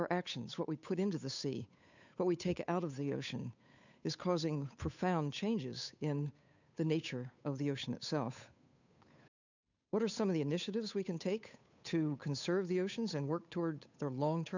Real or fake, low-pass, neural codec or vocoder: fake; 7.2 kHz; codec, 16 kHz, 8 kbps, FunCodec, trained on Chinese and English, 25 frames a second